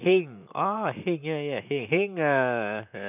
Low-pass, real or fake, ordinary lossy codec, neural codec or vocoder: 3.6 kHz; fake; none; vocoder, 44.1 kHz, 128 mel bands, Pupu-Vocoder